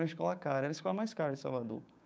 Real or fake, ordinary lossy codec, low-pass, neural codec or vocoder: fake; none; none; codec, 16 kHz, 6 kbps, DAC